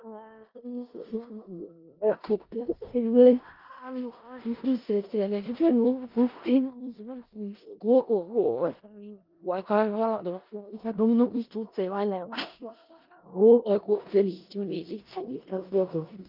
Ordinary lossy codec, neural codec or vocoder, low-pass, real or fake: Opus, 24 kbps; codec, 16 kHz in and 24 kHz out, 0.4 kbps, LongCat-Audio-Codec, four codebook decoder; 5.4 kHz; fake